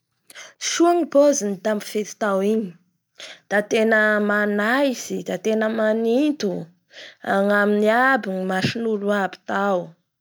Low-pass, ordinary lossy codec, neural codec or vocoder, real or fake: none; none; none; real